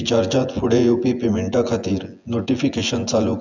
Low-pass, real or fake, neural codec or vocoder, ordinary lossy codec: 7.2 kHz; fake; vocoder, 24 kHz, 100 mel bands, Vocos; none